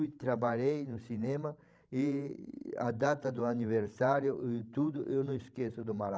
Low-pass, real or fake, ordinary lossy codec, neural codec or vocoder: none; fake; none; codec, 16 kHz, 16 kbps, FreqCodec, larger model